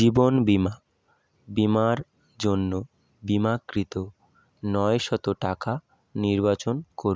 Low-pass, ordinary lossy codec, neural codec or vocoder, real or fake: none; none; none; real